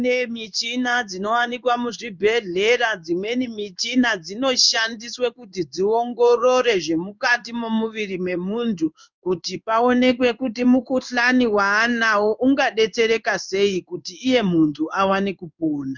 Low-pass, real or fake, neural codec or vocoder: 7.2 kHz; fake; codec, 16 kHz in and 24 kHz out, 1 kbps, XY-Tokenizer